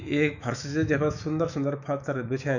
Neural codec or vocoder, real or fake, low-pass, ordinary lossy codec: autoencoder, 48 kHz, 128 numbers a frame, DAC-VAE, trained on Japanese speech; fake; 7.2 kHz; none